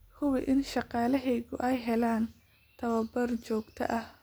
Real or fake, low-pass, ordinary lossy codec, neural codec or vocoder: real; none; none; none